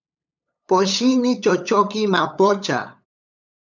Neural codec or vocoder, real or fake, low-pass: codec, 16 kHz, 8 kbps, FunCodec, trained on LibriTTS, 25 frames a second; fake; 7.2 kHz